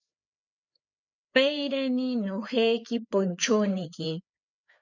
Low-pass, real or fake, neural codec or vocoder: 7.2 kHz; fake; codec, 16 kHz, 4 kbps, FreqCodec, larger model